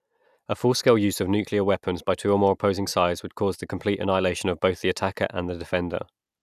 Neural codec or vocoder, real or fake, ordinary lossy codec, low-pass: none; real; none; 14.4 kHz